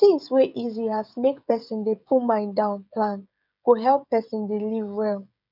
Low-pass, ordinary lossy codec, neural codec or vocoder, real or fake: 5.4 kHz; none; codec, 16 kHz, 16 kbps, FreqCodec, smaller model; fake